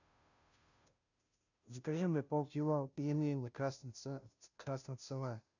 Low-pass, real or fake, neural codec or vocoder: 7.2 kHz; fake; codec, 16 kHz, 0.5 kbps, FunCodec, trained on Chinese and English, 25 frames a second